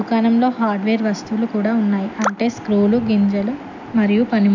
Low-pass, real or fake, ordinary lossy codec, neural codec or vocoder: 7.2 kHz; real; none; none